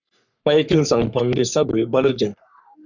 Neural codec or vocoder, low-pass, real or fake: codec, 44.1 kHz, 3.4 kbps, Pupu-Codec; 7.2 kHz; fake